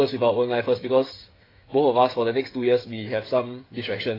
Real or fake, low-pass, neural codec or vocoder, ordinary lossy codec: fake; 5.4 kHz; codec, 16 kHz, 8 kbps, FreqCodec, smaller model; AAC, 24 kbps